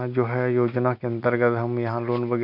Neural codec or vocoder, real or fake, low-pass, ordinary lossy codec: none; real; 5.4 kHz; none